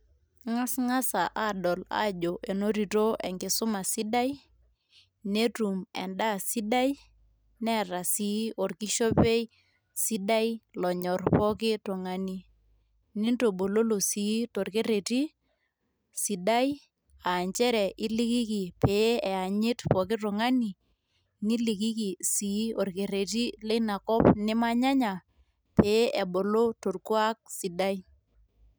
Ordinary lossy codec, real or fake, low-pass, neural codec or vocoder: none; real; none; none